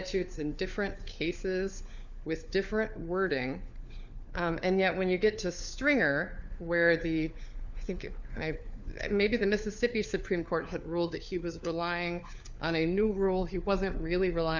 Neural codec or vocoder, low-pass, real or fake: codec, 16 kHz, 4 kbps, FunCodec, trained on LibriTTS, 50 frames a second; 7.2 kHz; fake